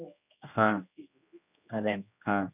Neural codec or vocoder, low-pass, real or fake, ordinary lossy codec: codec, 16 kHz, 1 kbps, X-Codec, HuBERT features, trained on general audio; 3.6 kHz; fake; none